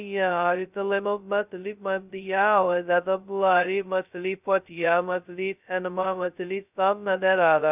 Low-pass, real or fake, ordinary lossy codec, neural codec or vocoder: 3.6 kHz; fake; none; codec, 16 kHz, 0.2 kbps, FocalCodec